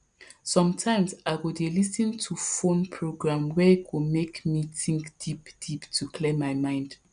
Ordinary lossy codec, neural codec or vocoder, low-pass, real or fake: none; none; 9.9 kHz; real